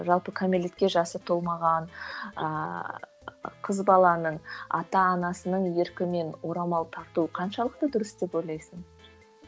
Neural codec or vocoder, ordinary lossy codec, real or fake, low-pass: none; none; real; none